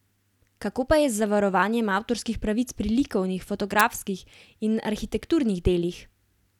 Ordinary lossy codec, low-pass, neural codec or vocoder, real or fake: none; 19.8 kHz; none; real